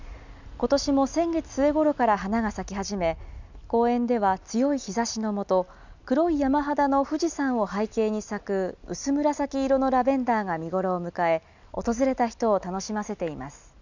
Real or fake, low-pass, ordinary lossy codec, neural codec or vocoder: real; 7.2 kHz; none; none